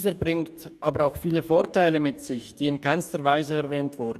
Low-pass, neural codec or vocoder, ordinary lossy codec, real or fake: 14.4 kHz; codec, 44.1 kHz, 2.6 kbps, DAC; none; fake